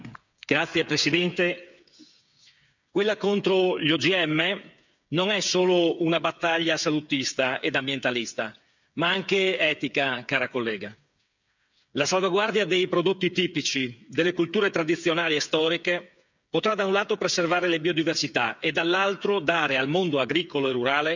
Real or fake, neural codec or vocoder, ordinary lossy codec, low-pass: fake; codec, 16 kHz, 8 kbps, FreqCodec, smaller model; none; 7.2 kHz